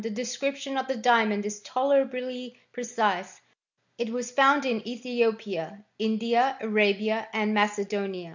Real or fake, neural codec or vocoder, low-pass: real; none; 7.2 kHz